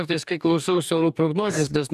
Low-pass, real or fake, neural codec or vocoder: 14.4 kHz; fake; codec, 44.1 kHz, 2.6 kbps, DAC